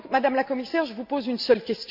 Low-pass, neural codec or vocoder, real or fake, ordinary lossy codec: 5.4 kHz; none; real; none